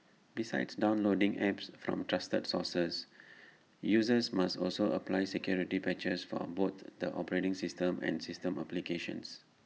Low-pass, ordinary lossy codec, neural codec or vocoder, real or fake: none; none; none; real